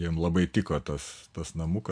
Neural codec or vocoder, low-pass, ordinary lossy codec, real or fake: none; 9.9 kHz; MP3, 64 kbps; real